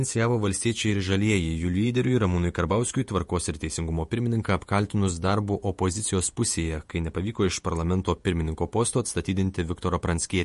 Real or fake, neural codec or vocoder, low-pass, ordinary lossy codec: fake; vocoder, 48 kHz, 128 mel bands, Vocos; 14.4 kHz; MP3, 48 kbps